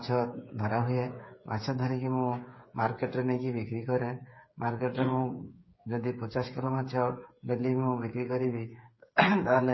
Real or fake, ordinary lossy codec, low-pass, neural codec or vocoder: fake; MP3, 24 kbps; 7.2 kHz; codec, 16 kHz, 8 kbps, FreqCodec, smaller model